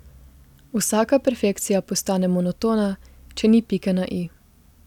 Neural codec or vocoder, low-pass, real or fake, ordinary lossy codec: none; 19.8 kHz; real; none